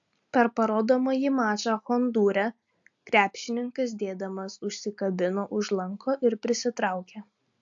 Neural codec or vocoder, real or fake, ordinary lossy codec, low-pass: none; real; AAC, 64 kbps; 7.2 kHz